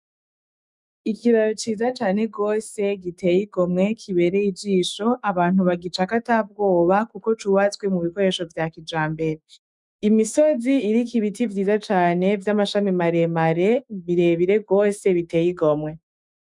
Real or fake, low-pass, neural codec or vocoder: fake; 10.8 kHz; autoencoder, 48 kHz, 128 numbers a frame, DAC-VAE, trained on Japanese speech